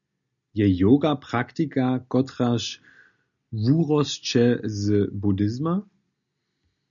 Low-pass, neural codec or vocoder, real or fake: 7.2 kHz; none; real